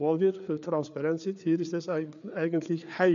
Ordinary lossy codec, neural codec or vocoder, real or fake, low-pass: none; codec, 16 kHz, 4 kbps, FreqCodec, larger model; fake; 7.2 kHz